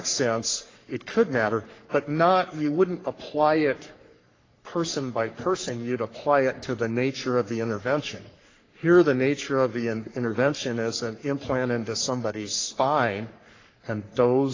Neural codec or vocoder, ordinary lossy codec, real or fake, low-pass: codec, 44.1 kHz, 3.4 kbps, Pupu-Codec; AAC, 32 kbps; fake; 7.2 kHz